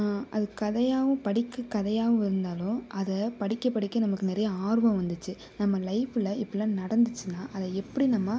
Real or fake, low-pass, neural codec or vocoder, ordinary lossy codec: real; none; none; none